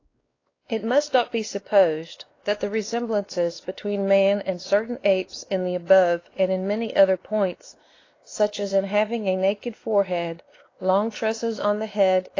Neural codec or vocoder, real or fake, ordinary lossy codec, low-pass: codec, 16 kHz, 2 kbps, X-Codec, WavLM features, trained on Multilingual LibriSpeech; fake; AAC, 32 kbps; 7.2 kHz